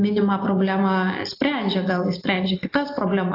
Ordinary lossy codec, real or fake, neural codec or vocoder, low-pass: AAC, 32 kbps; real; none; 5.4 kHz